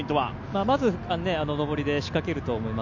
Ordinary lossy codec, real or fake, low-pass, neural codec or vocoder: none; real; 7.2 kHz; none